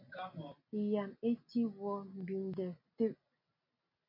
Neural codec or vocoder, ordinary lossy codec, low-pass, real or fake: none; MP3, 32 kbps; 5.4 kHz; real